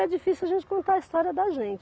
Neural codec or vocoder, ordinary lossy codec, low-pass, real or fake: none; none; none; real